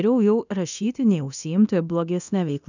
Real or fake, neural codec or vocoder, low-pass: fake; codec, 24 kHz, 0.9 kbps, DualCodec; 7.2 kHz